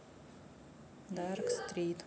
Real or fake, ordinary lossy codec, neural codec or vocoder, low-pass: real; none; none; none